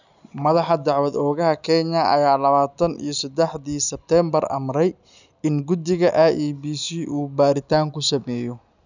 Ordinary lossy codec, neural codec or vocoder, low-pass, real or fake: none; none; 7.2 kHz; real